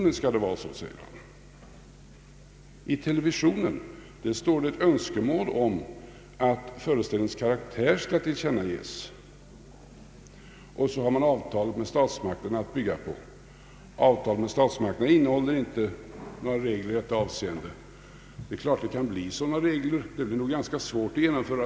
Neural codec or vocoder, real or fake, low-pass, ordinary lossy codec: none; real; none; none